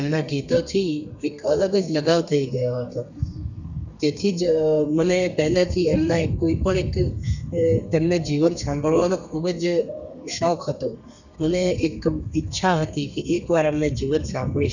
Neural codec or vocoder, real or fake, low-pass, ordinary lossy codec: codec, 32 kHz, 1.9 kbps, SNAC; fake; 7.2 kHz; none